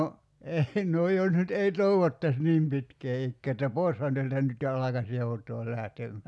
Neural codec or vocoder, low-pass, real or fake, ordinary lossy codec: none; none; real; none